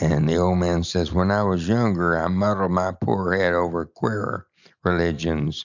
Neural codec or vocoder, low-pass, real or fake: none; 7.2 kHz; real